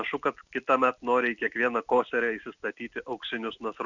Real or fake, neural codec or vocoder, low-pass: real; none; 7.2 kHz